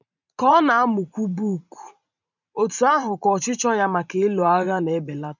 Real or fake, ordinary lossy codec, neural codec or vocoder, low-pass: fake; none; vocoder, 44.1 kHz, 128 mel bands every 512 samples, BigVGAN v2; 7.2 kHz